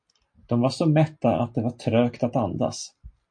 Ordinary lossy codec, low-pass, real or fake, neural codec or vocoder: MP3, 48 kbps; 9.9 kHz; real; none